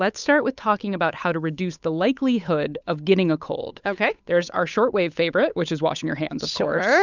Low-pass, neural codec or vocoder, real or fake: 7.2 kHz; codec, 16 kHz, 8 kbps, FunCodec, trained on Chinese and English, 25 frames a second; fake